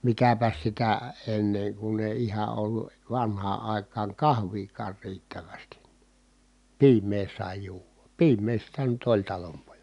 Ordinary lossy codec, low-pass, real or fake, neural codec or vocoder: none; 10.8 kHz; real; none